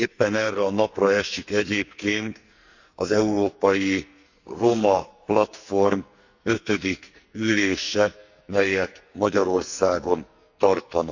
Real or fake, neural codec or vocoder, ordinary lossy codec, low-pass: fake; codec, 44.1 kHz, 2.6 kbps, SNAC; Opus, 64 kbps; 7.2 kHz